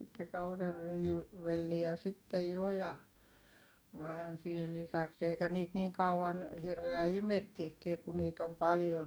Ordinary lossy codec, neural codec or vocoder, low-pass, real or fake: none; codec, 44.1 kHz, 2.6 kbps, DAC; none; fake